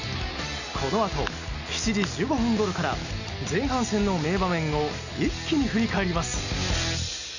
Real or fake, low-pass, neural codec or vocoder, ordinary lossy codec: real; 7.2 kHz; none; none